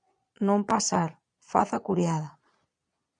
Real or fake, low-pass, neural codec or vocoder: fake; 9.9 kHz; vocoder, 22.05 kHz, 80 mel bands, Vocos